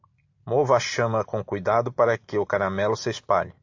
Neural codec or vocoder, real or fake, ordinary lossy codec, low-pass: none; real; AAC, 48 kbps; 7.2 kHz